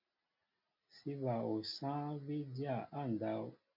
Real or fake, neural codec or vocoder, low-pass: real; none; 5.4 kHz